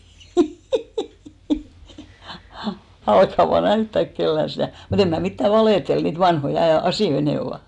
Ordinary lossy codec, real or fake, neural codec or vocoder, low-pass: none; real; none; 10.8 kHz